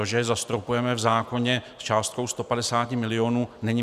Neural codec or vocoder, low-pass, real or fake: none; 14.4 kHz; real